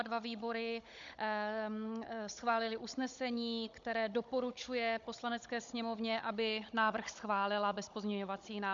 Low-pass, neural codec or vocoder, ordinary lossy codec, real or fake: 7.2 kHz; codec, 16 kHz, 16 kbps, FunCodec, trained on Chinese and English, 50 frames a second; MP3, 64 kbps; fake